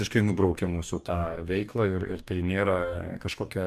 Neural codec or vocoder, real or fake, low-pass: codec, 44.1 kHz, 2.6 kbps, DAC; fake; 14.4 kHz